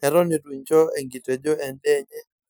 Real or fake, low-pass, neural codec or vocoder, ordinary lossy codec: real; none; none; none